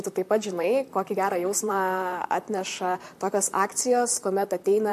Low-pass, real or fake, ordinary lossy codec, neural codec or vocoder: 14.4 kHz; fake; MP3, 64 kbps; vocoder, 44.1 kHz, 128 mel bands, Pupu-Vocoder